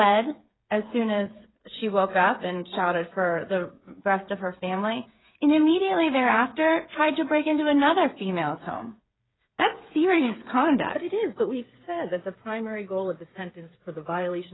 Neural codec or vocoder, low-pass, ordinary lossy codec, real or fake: codec, 16 kHz, 8 kbps, FreqCodec, smaller model; 7.2 kHz; AAC, 16 kbps; fake